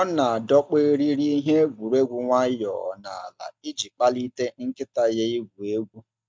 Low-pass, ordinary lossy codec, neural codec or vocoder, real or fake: none; none; none; real